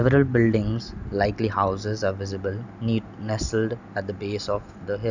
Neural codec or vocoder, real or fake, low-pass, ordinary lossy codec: none; real; 7.2 kHz; none